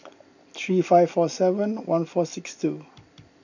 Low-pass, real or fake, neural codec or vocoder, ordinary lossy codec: 7.2 kHz; fake; vocoder, 44.1 kHz, 128 mel bands every 256 samples, BigVGAN v2; none